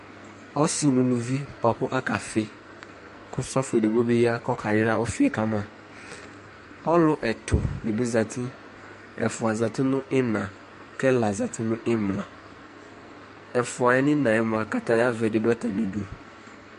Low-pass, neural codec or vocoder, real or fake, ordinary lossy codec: 14.4 kHz; codec, 44.1 kHz, 2.6 kbps, SNAC; fake; MP3, 48 kbps